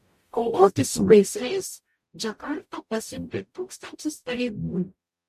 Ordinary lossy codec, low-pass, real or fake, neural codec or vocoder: MP3, 64 kbps; 14.4 kHz; fake; codec, 44.1 kHz, 0.9 kbps, DAC